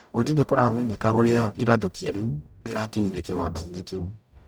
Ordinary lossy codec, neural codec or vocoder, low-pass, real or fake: none; codec, 44.1 kHz, 0.9 kbps, DAC; none; fake